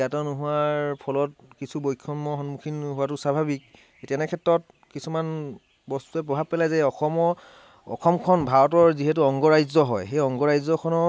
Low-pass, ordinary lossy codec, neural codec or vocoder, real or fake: none; none; none; real